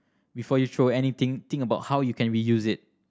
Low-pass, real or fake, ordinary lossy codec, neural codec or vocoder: none; real; none; none